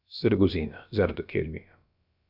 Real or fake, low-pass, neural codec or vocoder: fake; 5.4 kHz; codec, 16 kHz, about 1 kbps, DyCAST, with the encoder's durations